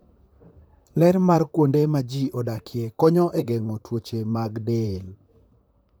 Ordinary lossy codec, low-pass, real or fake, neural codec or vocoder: none; none; fake; vocoder, 44.1 kHz, 128 mel bands, Pupu-Vocoder